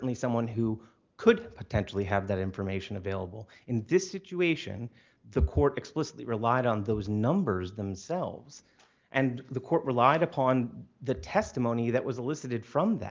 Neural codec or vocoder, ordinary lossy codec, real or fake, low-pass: none; Opus, 32 kbps; real; 7.2 kHz